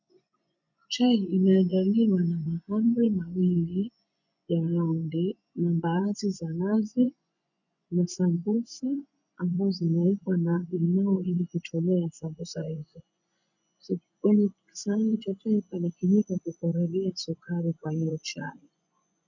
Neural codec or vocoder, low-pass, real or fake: vocoder, 24 kHz, 100 mel bands, Vocos; 7.2 kHz; fake